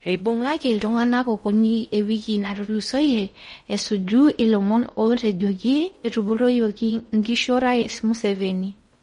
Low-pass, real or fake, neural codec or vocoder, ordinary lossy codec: 10.8 kHz; fake; codec, 16 kHz in and 24 kHz out, 0.6 kbps, FocalCodec, streaming, 4096 codes; MP3, 48 kbps